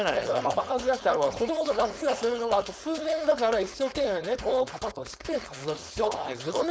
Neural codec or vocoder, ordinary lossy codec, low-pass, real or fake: codec, 16 kHz, 4.8 kbps, FACodec; none; none; fake